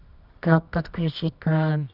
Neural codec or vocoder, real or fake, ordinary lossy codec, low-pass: codec, 24 kHz, 0.9 kbps, WavTokenizer, medium music audio release; fake; none; 5.4 kHz